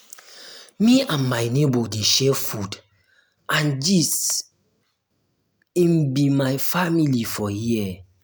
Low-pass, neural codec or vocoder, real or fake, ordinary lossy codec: none; none; real; none